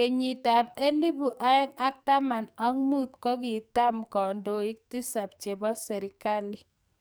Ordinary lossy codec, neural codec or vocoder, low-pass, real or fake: none; codec, 44.1 kHz, 2.6 kbps, SNAC; none; fake